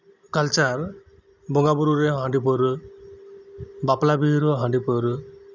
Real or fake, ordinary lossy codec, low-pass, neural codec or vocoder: real; none; 7.2 kHz; none